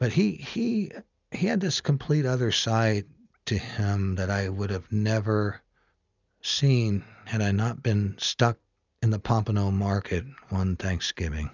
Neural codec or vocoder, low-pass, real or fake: none; 7.2 kHz; real